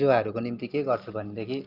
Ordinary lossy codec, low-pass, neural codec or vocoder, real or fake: Opus, 32 kbps; 5.4 kHz; none; real